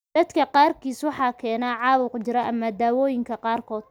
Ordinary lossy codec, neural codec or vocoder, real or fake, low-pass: none; none; real; none